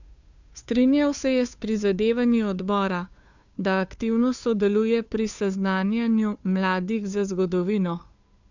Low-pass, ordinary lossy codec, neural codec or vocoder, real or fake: 7.2 kHz; none; codec, 16 kHz, 2 kbps, FunCodec, trained on Chinese and English, 25 frames a second; fake